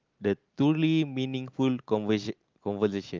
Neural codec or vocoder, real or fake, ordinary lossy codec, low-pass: none; real; Opus, 24 kbps; 7.2 kHz